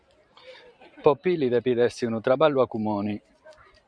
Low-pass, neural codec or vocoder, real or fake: 9.9 kHz; vocoder, 44.1 kHz, 128 mel bands every 512 samples, BigVGAN v2; fake